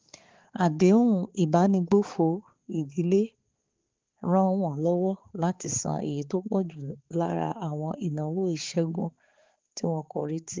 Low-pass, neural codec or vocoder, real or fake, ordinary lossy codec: 7.2 kHz; codec, 16 kHz, 4 kbps, X-Codec, HuBERT features, trained on balanced general audio; fake; Opus, 16 kbps